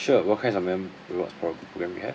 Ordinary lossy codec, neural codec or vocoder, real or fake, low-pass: none; none; real; none